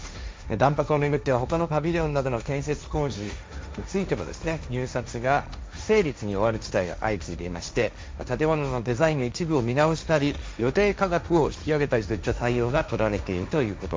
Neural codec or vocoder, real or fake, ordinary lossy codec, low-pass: codec, 16 kHz, 1.1 kbps, Voila-Tokenizer; fake; none; none